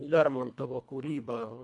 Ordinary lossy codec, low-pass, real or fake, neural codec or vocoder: MP3, 96 kbps; 10.8 kHz; fake; codec, 24 kHz, 1.5 kbps, HILCodec